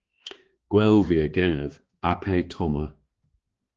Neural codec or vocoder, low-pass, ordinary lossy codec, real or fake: codec, 16 kHz, 2 kbps, X-Codec, HuBERT features, trained on balanced general audio; 7.2 kHz; Opus, 16 kbps; fake